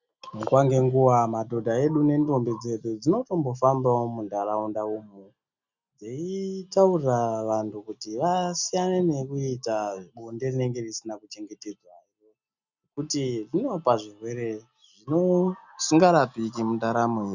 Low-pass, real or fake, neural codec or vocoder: 7.2 kHz; real; none